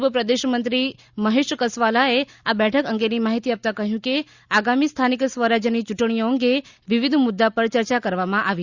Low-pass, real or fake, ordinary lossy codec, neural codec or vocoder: 7.2 kHz; real; Opus, 64 kbps; none